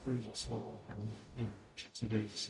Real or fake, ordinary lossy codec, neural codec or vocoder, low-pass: fake; MP3, 64 kbps; codec, 44.1 kHz, 0.9 kbps, DAC; 10.8 kHz